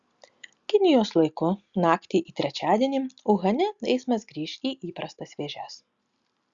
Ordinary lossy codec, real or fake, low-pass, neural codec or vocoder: Opus, 64 kbps; real; 7.2 kHz; none